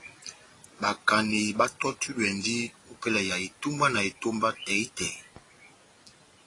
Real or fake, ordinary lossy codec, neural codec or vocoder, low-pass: real; AAC, 32 kbps; none; 10.8 kHz